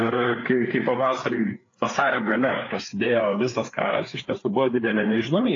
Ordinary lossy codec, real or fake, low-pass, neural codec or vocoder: AAC, 32 kbps; fake; 7.2 kHz; codec, 16 kHz, 4 kbps, FreqCodec, larger model